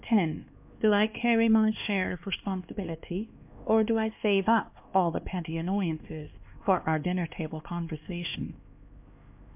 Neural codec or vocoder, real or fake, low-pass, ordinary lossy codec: codec, 16 kHz, 2 kbps, X-Codec, HuBERT features, trained on LibriSpeech; fake; 3.6 kHz; MP3, 32 kbps